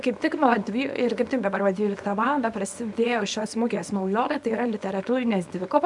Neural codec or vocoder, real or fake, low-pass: codec, 24 kHz, 0.9 kbps, WavTokenizer, small release; fake; 10.8 kHz